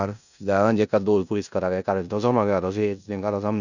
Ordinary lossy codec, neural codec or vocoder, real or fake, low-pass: none; codec, 16 kHz in and 24 kHz out, 0.9 kbps, LongCat-Audio-Codec, four codebook decoder; fake; 7.2 kHz